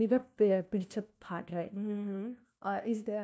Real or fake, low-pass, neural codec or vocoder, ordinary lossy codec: fake; none; codec, 16 kHz, 1 kbps, FunCodec, trained on LibriTTS, 50 frames a second; none